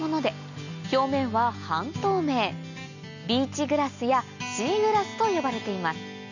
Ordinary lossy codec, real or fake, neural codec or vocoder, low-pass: none; real; none; 7.2 kHz